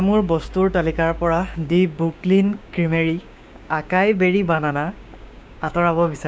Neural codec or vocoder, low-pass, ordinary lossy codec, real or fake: codec, 16 kHz, 6 kbps, DAC; none; none; fake